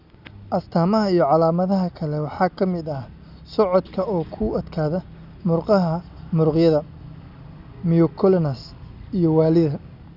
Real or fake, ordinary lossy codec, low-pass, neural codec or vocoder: real; none; 5.4 kHz; none